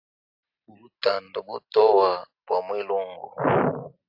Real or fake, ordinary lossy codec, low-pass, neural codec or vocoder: real; AAC, 48 kbps; 5.4 kHz; none